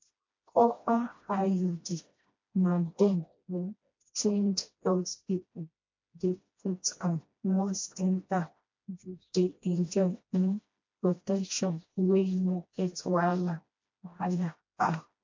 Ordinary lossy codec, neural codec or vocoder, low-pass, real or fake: MP3, 48 kbps; codec, 16 kHz, 1 kbps, FreqCodec, smaller model; 7.2 kHz; fake